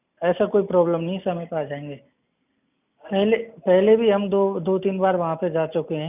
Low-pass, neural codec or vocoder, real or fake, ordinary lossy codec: 3.6 kHz; none; real; none